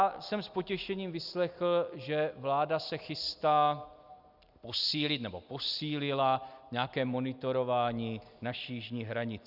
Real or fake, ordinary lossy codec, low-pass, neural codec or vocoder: real; Opus, 64 kbps; 5.4 kHz; none